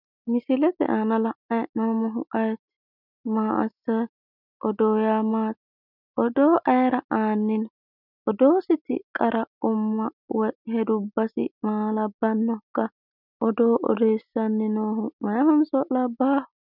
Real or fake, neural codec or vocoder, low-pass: real; none; 5.4 kHz